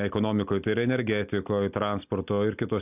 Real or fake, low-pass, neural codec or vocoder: real; 3.6 kHz; none